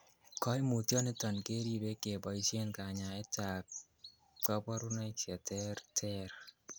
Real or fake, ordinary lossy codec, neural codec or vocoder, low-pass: real; none; none; none